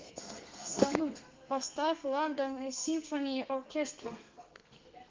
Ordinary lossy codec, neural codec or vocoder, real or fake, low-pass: Opus, 24 kbps; codec, 32 kHz, 1.9 kbps, SNAC; fake; 7.2 kHz